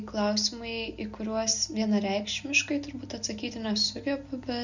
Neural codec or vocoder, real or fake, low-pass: none; real; 7.2 kHz